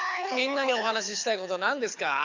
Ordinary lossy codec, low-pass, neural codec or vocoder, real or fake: AAC, 48 kbps; 7.2 kHz; codec, 24 kHz, 6 kbps, HILCodec; fake